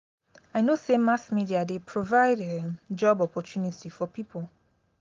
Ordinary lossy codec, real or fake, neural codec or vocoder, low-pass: Opus, 32 kbps; real; none; 7.2 kHz